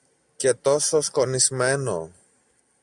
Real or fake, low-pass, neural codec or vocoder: real; 10.8 kHz; none